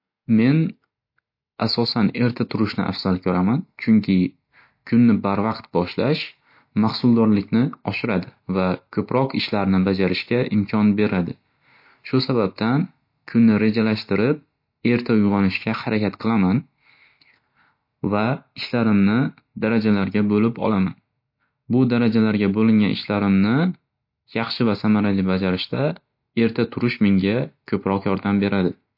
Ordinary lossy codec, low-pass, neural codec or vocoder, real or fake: MP3, 32 kbps; 5.4 kHz; none; real